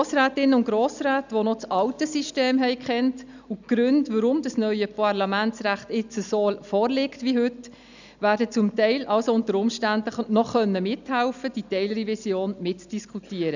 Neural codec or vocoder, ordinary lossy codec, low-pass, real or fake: none; none; 7.2 kHz; real